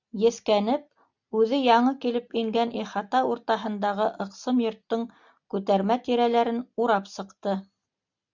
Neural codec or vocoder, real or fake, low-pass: none; real; 7.2 kHz